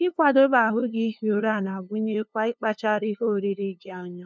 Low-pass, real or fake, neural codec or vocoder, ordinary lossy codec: none; fake; codec, 16 kHz, 2 kbps, FunCodec, trained on LibriTTS, 25 frames a second; none